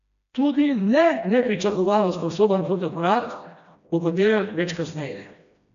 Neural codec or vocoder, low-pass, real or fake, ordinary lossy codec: codec, 16 kHz, 1 kbps, FreqCodec, smaller model; 7.2 kHz; fake; none